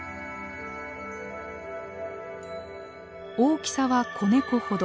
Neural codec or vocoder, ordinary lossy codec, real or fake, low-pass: none; none; real; none